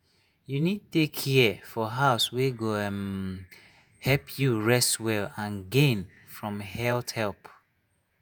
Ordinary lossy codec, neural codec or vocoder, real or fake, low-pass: none; vocoder, 48 kHz, 128 mel bands, Vocos; fake; none